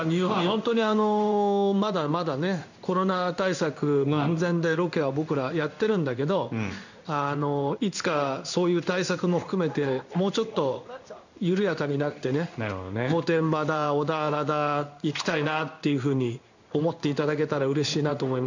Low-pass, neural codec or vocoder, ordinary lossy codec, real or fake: 7.2 kHz; codec, 16 kHz in and 24 kHz out, 1 kbps, XY-Tokenizer; none; fake